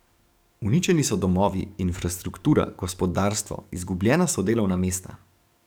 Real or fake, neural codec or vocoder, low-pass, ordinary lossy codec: fake; codec, 44.1 kHz, 7.8 kbps, DAC; none; none